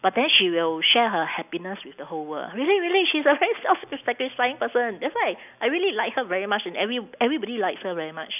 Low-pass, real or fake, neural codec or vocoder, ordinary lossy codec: 3.6 kHz; real; none; none